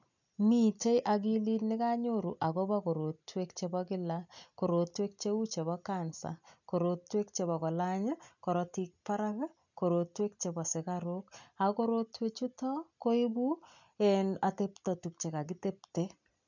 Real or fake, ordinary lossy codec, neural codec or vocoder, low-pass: real; none; none; 7.2 kHz